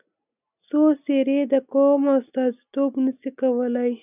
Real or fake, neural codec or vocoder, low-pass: real; none; 3.6 kHz